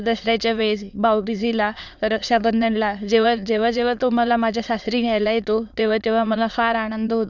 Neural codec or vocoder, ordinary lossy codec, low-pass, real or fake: autoencoder, 22.05 kHz, a latent of 192 numbers a frame, VITS, trained on many speakers; none; 7.2 kHz; fake